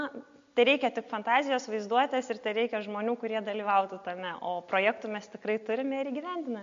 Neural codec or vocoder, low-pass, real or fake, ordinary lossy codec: none; 7.2 kHz; real; MP3, 48 kbps